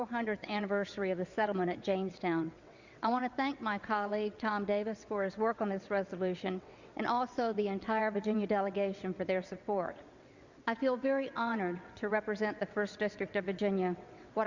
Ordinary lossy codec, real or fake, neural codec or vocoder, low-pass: Opus, 64 kbps; fake; vocoder, 22.05 kHz, 80 mel bands, WaveNeXt; 7.2 kHz